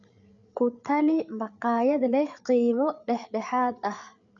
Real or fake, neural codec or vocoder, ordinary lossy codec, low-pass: fake; codec, 16 kHz, 8 kbps, FreqCodec, larger model; none; 7.2 kHz